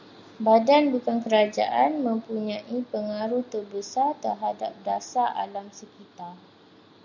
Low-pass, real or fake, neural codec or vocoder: 7.2 kHz; real; none